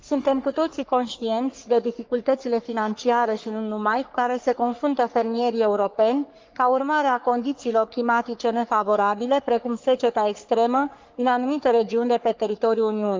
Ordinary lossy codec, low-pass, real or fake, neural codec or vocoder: Opus, 24 kbps; 7.2 kHz; fake; codec, 44.1 kHz, 3.4 kbps, Pupu-Codec